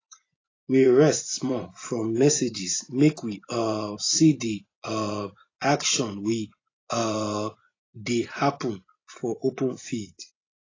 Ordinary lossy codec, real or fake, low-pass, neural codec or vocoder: AAC, 32 kbps; fake; 7.2 kHz; vocoder, 24 kHz, 100 mel bands, Vocos